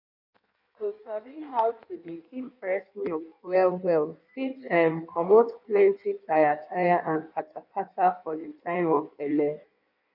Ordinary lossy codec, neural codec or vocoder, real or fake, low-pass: none; codec, 16 kHz in and 24 kHz out, 1.1 kbps, FireRedTTS-2 codec; fake; 5.4 kHz